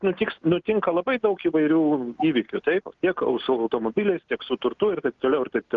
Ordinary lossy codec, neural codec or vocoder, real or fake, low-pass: Opus, 32 kbps; none; real; 7.2 kHz